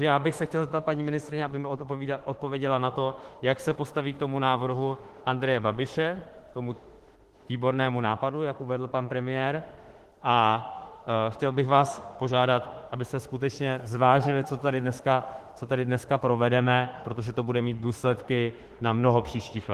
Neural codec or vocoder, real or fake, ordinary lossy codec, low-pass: autoencoder, 48 kHz, 32 numbers a frame, DAC-VAE, trained on Japanese speech; fake; Opus, 16 kbps; 14.4 kHz